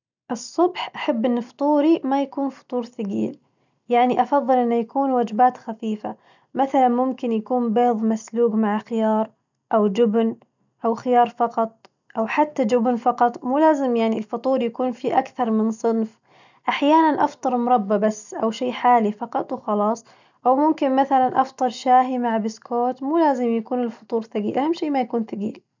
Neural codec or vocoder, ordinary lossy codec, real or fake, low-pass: none; none; real; 7.2 kHz